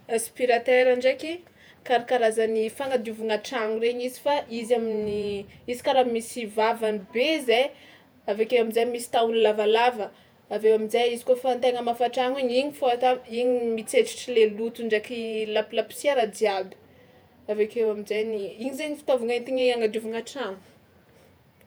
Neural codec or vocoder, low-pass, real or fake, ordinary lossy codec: vocoder, 48 kHz, 128 mel bands, Vocos; none; fake; none